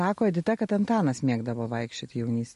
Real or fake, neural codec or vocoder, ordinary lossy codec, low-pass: real; none; MP3, 48 kbps; 14.4 kHz